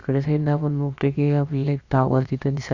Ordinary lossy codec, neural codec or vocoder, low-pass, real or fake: Opus, 64 kbps; codec, 16 kHz, about 1 kbps, DyCAST, with the encoder's durations; 7.2 kHz; fake